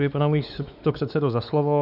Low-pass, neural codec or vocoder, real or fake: 5.4 kHz; codec, 16 kHz, 4 kbps, X-Codec, WavLM features, trained on Multilingual LibriSpeech; fake